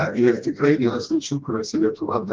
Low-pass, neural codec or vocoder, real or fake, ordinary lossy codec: 7.2 kHz; codec, 16 kHz, 1 kbps, FreqCodec, smaller model; fake; Opus, 32 kbps